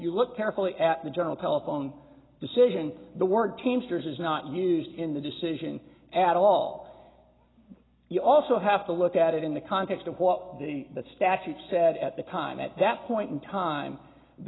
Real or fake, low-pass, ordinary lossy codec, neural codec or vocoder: real; 7.2 kHz; AAC, 16 kbps; none